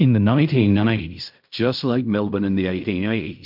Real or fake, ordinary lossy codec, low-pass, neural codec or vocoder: fake; MP3, 48 kbps; 5.4 kHz; codec, 16 kHz in and 24 kHz out, 0.4 kbps, LongCat-Audio-Codec, fine tuned four codebook decoder